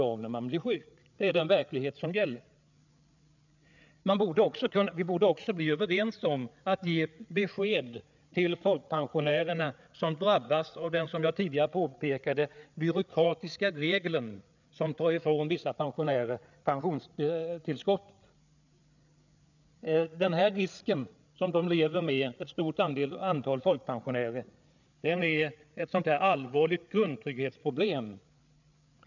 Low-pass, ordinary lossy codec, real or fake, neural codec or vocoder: 7.2 kHz; none; fake; codec, 16 kHz, 8 kbps, FreqCodec, larger model